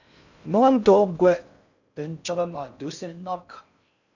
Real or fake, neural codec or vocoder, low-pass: fake; codec, 16 kHz in and 24 kHz out, 0.6 kbps, FocalCodec, streaming, 4096 codes; 7.2 kHz